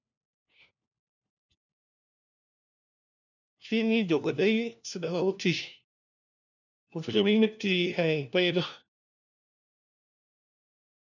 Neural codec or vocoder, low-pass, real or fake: codec, 16 kHz, 1 kbps, FunCodec, trained on LibriTTS, 50 frames a second; 7.2 kHz; fake